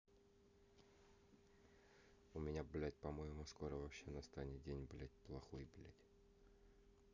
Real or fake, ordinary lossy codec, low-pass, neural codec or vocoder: real; none; 7.2 kHz; none